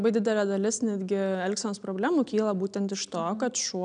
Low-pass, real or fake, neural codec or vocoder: 9.9 kHz; real; none